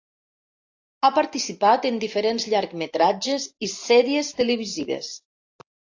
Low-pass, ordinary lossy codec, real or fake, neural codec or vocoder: 7.2 kHz; AAC, 48 kbps; real; none